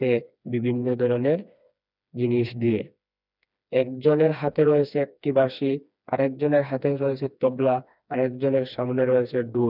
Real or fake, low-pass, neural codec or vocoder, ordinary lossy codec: fake; 5.4 kHz; codec, 16 kHz, 2 kbps, FreqCodec, smaller model; none